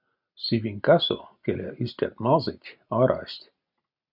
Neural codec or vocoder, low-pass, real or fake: none; 5.4 kHz; real